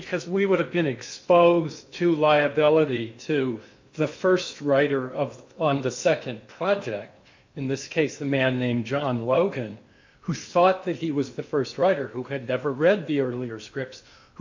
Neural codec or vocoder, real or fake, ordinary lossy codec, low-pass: codec, 16 kHz in and 24 kHz out, 0.8 kbps, FocalCodec, streaming, 65536 codes; fake; MP3, 48 kbps; 7.2 kHz